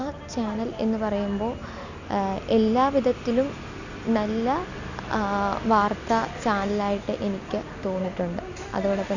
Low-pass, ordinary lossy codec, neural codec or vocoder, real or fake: 7.2 kHz; none; none; real